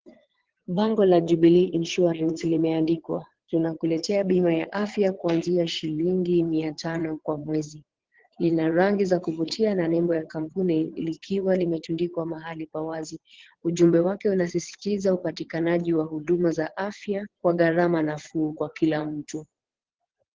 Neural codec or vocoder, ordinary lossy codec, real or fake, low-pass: vocoder, 22.05 kHz, 80 mel bands, WaveNeXt; Opus, 16 kbps; fake; 7.2 kHz